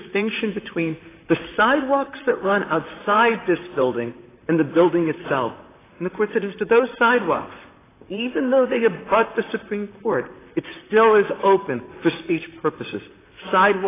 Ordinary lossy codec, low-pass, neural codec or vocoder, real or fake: AAC, 16 kbps; 3.6 kHz; vocoder, 44.1 kHz, 128 mel bands, Pupu-Vocoder; fake